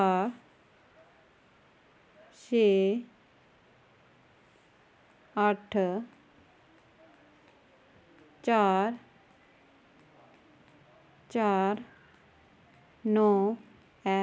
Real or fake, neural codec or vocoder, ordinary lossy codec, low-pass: real; none; none; none